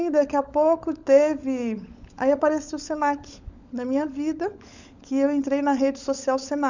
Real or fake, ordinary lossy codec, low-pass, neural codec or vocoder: fake; none; 7.2 kHz; codec, 16 kHz, 16 kbps, FunCodec, trained on LibriTTS, 50 frames a second